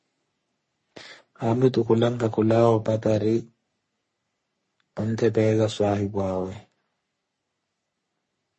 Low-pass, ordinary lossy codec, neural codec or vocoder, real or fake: 10.8 kHz; MP3, 32 kbps; codec, 44.1 kHz, 3.4 kbps, Pupu-Codec; fake